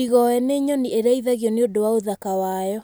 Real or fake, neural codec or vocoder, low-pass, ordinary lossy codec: real; none; none; none